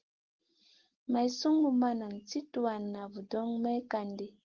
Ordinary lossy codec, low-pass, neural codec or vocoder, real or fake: Opus, 16 kbps; 7.2 kHz; none; real